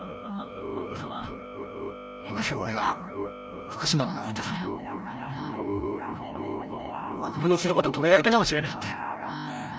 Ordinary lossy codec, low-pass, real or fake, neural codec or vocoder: none; none; fake; codec, 16 kHz, 0.5 kbps, FreqCodec, larger model